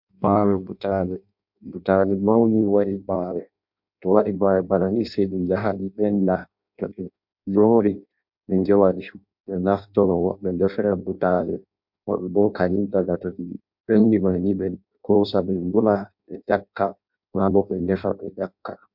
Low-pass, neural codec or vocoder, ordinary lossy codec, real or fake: 5.4 kHz; codec, 16 kHz in and 24 kHz out, 0.6 kbps, FireRedTTS-2 codec; MP3, 48 kbps; fake